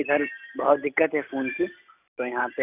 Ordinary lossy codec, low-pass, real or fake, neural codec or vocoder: none; 3.6 kHz; real; none